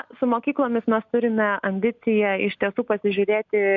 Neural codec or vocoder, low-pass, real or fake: none; 7.2 kHz; real